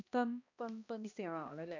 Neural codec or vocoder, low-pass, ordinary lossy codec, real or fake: codec, 16 kHz, 1 kbps, X-Codec, HuBERT features, trained on balanced general audio; 7.2 kHz; none; fake